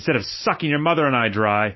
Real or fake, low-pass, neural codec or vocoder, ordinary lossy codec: real; 7.2 kHz; none; MP3, 24 kbps